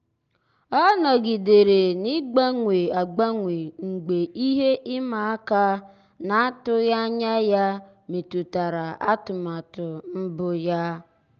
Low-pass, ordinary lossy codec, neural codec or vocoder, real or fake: 7.2 kHz; Opus, 24 kbps; none; real